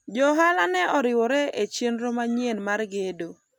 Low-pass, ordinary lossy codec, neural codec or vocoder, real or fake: 19.8 kHz; none; none; real